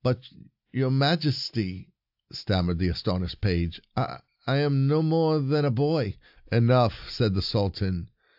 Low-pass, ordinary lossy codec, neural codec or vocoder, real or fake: 5.4 kHz; AAC, 48 kbps; none; real